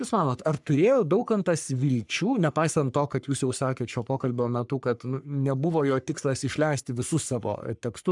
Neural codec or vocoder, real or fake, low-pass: codec, 44.1 kHz, 3.4 kbps, Pupu-Codec; fake; 10.8 kHz